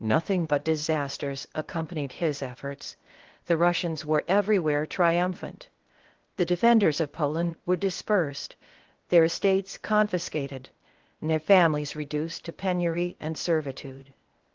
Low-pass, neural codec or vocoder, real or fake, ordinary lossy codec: 7.2 kHz; codec, 16 kHz, 0.8 kbps, ZipCodec; fake; Opus, 16 kbps